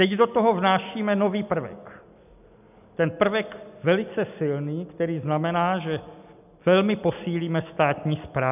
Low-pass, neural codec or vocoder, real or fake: 3.6 kHz; none; real